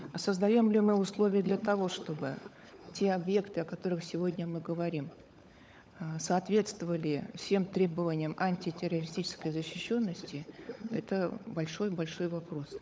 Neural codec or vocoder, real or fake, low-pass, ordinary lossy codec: codec, 16 kHz, 16 kbps, FunCodec, trained on LibriTTS, 50 frames a second; fake; none; none